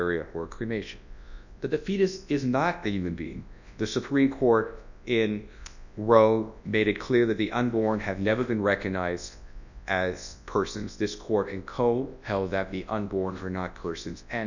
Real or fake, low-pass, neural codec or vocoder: fake; 7.2 kHz; codec, 24 kHz, 0.9 kbps, WavTokenizer, large speech release